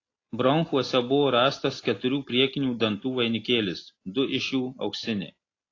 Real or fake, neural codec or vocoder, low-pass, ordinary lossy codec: real; none; 7.2 kHz; AAC, 32 kbps